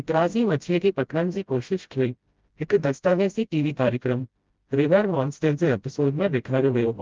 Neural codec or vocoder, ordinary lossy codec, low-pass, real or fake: codec, 16 kHz, 0.5 kbps, FreqCodec, smaller model; Opus, 32 kbps; 7.2 kHz; fake